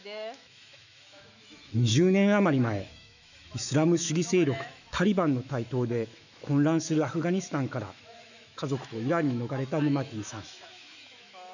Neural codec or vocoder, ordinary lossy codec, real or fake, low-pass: autoencoder, 48 kHz, 128 numbers a frame, DAC-VAE, trained on Japanese speech; none; fake; 7.2 kHz